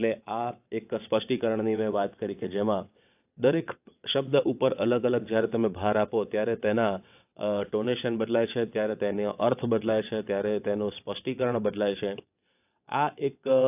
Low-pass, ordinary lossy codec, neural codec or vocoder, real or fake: 3.6 kHz; AAC, 32 kbps; vocoder, 22.05 kHz, 80 mel bands, WaveNeXt; fake